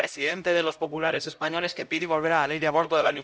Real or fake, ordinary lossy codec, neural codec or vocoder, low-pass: fake; none; codec, 16 kHz, 0.5 kbps, X-Codec, HuBERT features, trained on LibriSpeech; none